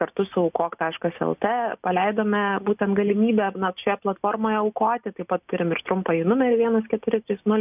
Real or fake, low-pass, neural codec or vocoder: real; 3.6 kHz; none